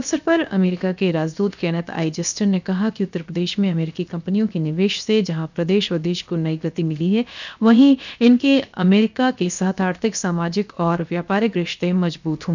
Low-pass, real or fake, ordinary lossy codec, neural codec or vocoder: 7.2 kHz; fake; none; codec, 16 kHz, 0.7 kbps, FocalCodec